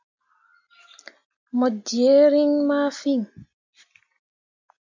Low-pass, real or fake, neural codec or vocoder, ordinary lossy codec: 7.2 kHz; real; none; MP3, 64 kbps